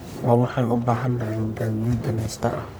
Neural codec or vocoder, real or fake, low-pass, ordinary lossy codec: codec, 44.1 kHz, 1.7 kbps, Pupu-Codec; fake; none; none